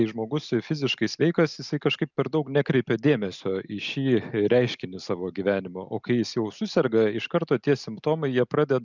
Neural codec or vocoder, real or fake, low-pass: none; real; 7.2 kHz